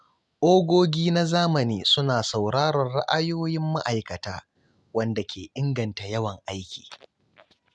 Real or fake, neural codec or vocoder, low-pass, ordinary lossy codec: real; none; none; none